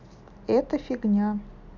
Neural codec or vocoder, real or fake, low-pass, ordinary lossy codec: none; real; 7.2 kHz; none